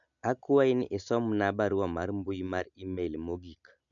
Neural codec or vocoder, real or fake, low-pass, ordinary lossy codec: none; real; 7.2 kHz; none